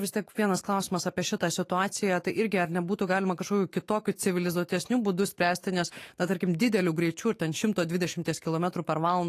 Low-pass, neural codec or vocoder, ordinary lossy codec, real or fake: 14.4 kHz; autoencoder, 48 kHz, 128 numbers a frame, DAC-VAE, trained on Japanese speech; AAC, 48 kbps; fake